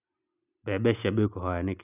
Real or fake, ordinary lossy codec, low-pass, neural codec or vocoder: real; none; 3.6 kHz; none